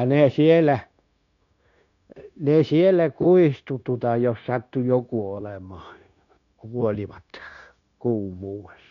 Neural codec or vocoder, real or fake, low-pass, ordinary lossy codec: codec, 16 kHz, 0.9 kbps, LongCat-Audio-Codec; fake; 7.2 kHz; none